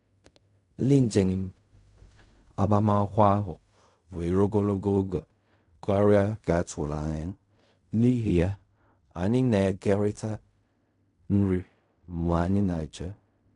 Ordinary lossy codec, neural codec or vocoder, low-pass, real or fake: MP3, 96 kbps; codec, 16 kHz in and 24 kHz out, 0.4 kbps, LongCat-Audio-Codec, fine tuned four codebook decoder; 10.8 kHz; fake